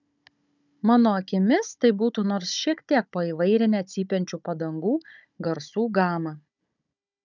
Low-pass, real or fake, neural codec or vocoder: 7.2 kHz; fake; codec, 16 kHz, 16 kbps, FunCodec, trained on Chinese and English, 50 frames a second